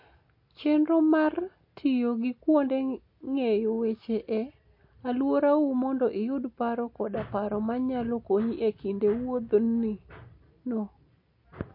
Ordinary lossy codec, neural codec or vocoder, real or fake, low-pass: MP3, 32 kbps; none; real; 5.4 kHz